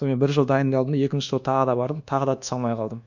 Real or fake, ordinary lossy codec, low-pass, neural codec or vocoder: fake; none; 7.2 kHz; codec, 24 kHz, 1.2 kbps, DualCodec